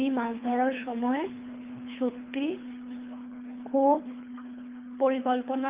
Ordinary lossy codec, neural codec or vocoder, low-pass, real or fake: Opus, 32 kbps; codec, 24 kHz, 3 kbps, HILCodec; 3.6 kHz; fake